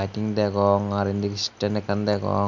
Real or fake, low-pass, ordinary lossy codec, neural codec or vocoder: real; 7.2 kHz; none; none